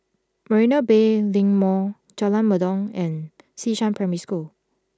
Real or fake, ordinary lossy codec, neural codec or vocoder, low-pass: real; none; none; none